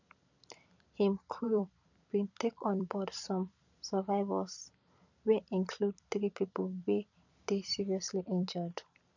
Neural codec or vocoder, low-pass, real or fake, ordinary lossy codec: vocoder, 44.1 kHz, 128 mel bands every 256 samples, BigVGAN v2; 7.2 kHz; fake; none